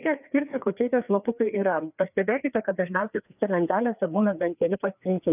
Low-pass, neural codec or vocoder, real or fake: 3.6 kHz; codec, 16 kHz, 2 kbps, FreqCodec, larger model; fake